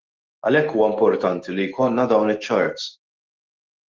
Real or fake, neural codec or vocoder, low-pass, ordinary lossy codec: fake; autoencoder, 48 kHz, 128 numbers a frame, DAC-VAE, trained on Japanese speech; 7.2 kHz; Opus, 16 kbps